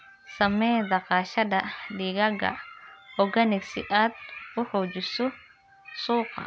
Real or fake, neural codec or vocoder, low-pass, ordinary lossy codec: real; none; none; none